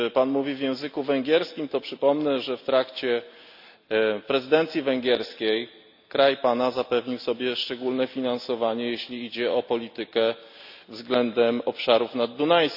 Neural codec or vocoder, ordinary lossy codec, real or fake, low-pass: none; none; real; 5.4 kHz